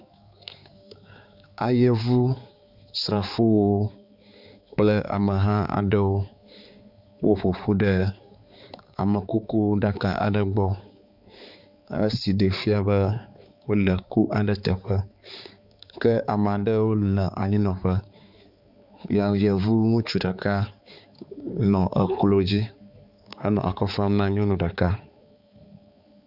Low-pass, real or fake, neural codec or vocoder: 5.4 kHz; fake; codec, 16 kHz, 4 kbps, X-Codec, HuBERT features, trained on balanced general audio